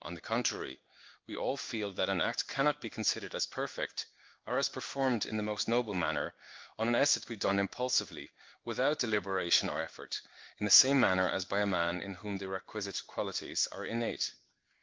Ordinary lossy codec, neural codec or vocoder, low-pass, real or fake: Opus, 24 kbps; codec, 16 kHz in and 24 kHz out, 1 kbps, XY-Tokenizer; 7.2 kHz; fake